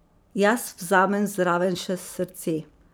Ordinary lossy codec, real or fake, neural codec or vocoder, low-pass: none; fake; vocoder, 44.1 kHz, 128 mel bands every 512 samples, BigVGAN v2; none